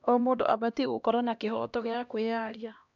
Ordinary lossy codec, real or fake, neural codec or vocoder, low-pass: none; fake; codec, 16 kHz, 1 kbps, X-Codec, HuBERT features, trained on LibriSpeech; 7.2 kHz